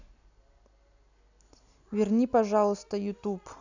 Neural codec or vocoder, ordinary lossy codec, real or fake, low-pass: none; none; real; 7.2 kHz